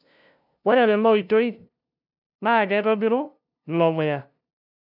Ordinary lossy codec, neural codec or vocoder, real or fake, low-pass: none; codec, 16 kHz, 0.5 kbps, FunCodec, trained on LibriTTS, 25 frames a second; fake; 5.4 kHz